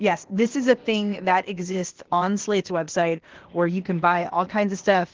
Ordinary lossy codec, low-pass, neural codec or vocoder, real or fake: Opus, 16 kbps; 7.2 kHz; codec, 16 kHz, 0.8 kbps, ZipCodec; fake